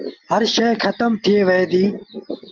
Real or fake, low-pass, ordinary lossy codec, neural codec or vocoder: real; 7.2 kHz; Opus, 32 kbps; none